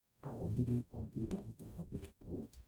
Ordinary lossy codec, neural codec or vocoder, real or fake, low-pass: none; codec, 44.1 kHz, 0.9 kbps, DAC; fake; none